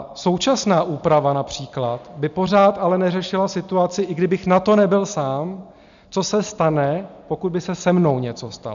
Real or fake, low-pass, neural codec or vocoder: real; 7.2 kHz; none